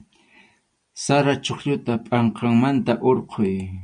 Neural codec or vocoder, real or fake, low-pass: none; real; 9.9 kHz